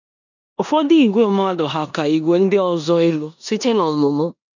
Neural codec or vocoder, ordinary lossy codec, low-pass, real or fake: codec, 16 kHz in and 24 kHz out, 0.9 kbps, LongCat-Audio-Codec, four codebook decoder; none; 7.2 kHz; fake